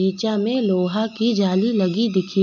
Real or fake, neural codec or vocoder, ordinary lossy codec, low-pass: real; none; none; 7.2 kHz